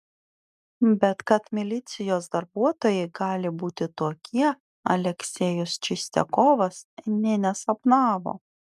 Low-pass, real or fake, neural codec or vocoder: 14.4 kHz; real; none